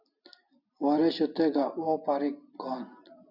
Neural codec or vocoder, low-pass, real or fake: none; 5.4 kHz; real